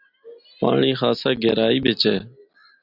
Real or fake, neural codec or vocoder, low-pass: real; none; 5.4 kHz